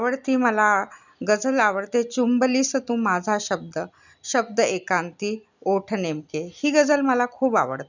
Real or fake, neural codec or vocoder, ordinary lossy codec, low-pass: real; none; none; 7.2 kHz